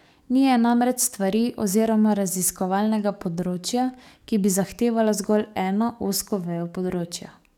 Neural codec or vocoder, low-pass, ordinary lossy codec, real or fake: codec, 44.1 kHz, 7.8 kbps, DAC; 19.8 kHz; none; fake